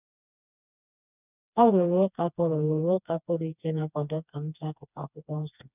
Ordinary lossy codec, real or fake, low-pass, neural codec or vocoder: none; fake; 3.6 kHz; codec, 16 kHz, 2 kbps, FreqCodec, smaller model